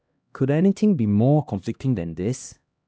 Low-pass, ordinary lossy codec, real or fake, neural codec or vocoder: none; none; fake; codec, 16 kHz, 1 kbps, X-Codec, HuBERT features, trained on LibriSpeech